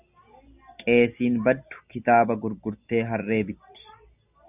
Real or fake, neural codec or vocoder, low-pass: real; none; 3.6 kHz